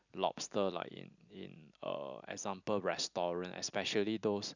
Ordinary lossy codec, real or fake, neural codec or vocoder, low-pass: none; real; none; 7.2 kHz